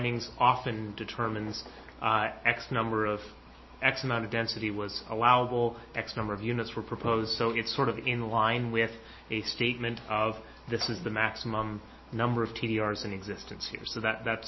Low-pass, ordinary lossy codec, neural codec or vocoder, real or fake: 7.2 kHz; MP3, 24 kbps; none; real